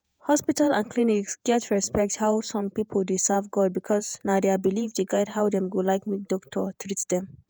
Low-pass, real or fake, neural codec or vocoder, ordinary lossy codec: none; fake; vocoder, 48 kHz, 128 mel bands, Vocos; none